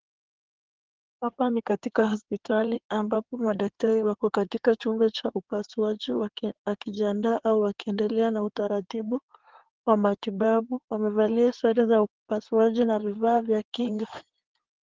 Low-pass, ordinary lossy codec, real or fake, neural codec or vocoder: 7.2 kHz; Opus, 16 kbps; fake; codec, 16 kHz in and 24 kHz out, 2.2 kbps, FireRedTTS-2 codec